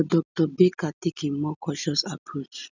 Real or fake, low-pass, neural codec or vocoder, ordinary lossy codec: real; 7.2 kHz; none; none